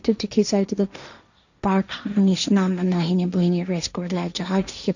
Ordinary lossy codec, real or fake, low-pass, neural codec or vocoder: none; fake; 7.2 kHz; codec, 16 kHz, 1.1 kbps, Voila-Tokenizer